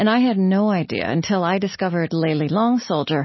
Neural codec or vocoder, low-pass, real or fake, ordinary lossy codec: none; 7.2 kHz; real; MP3, 24 kbps